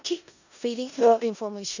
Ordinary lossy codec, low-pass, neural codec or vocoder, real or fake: none; 7.2 kHz; codec, 16 kHz in and 24 kHz out, 0.4 kbps, LongCat-Audio-Codec, four codebook decoder; fake